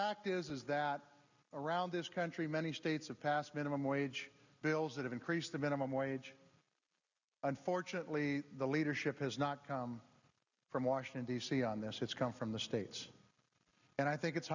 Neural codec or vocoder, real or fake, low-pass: none; real; 7.2 kHz